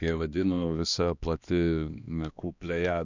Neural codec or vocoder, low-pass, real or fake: codec, 16 kHz, 2 kbps, X-Codec, HuBERT features, trained on balanced general audio; 7.2 kHz; fake